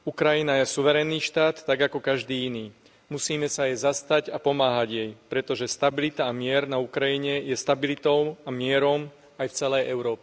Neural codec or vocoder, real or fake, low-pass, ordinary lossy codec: none; real; none; none